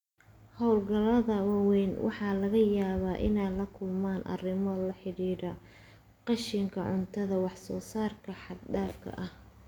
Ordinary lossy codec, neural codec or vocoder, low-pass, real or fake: none; none; 19.8 kHz; real